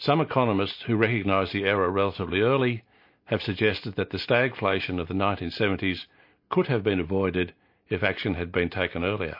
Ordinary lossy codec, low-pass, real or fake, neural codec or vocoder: MP3, 32 kbps; 5.4 kHz; real; none